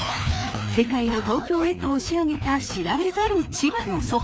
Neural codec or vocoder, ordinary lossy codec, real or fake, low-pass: codec, 16 kHz, 2 kbps, FreqCodec, larger model; none; fake; none